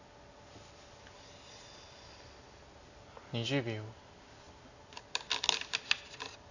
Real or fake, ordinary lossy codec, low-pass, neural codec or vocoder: real; none; 7.2 kHz; none